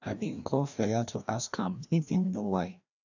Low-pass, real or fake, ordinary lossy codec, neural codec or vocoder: 7.2 kHz; fake; none; codec, 16 kHz, 1 kbps, FreqCodec, larger model